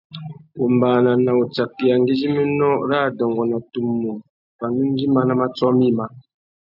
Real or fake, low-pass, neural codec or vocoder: real; 5.4 kHz; none